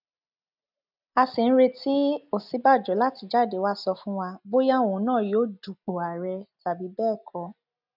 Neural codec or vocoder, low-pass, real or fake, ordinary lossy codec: none; 5.4 kHz; real; none